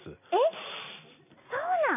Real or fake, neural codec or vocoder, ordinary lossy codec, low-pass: real; none; none; 3.6 kHz